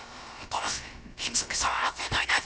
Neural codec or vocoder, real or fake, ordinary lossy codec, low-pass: codec, 16 kHz, about 1 kbps, DyCAST, with the encoder's durations; fake; none; none